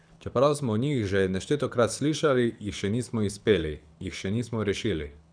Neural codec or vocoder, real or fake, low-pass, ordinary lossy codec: codec, 24 kHz, 6 kbps, HILCodec; fake; 9.9 kHz; none